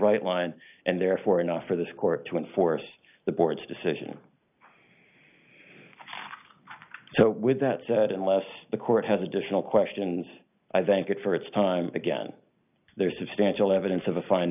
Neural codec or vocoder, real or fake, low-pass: none; real; 3.6 kHz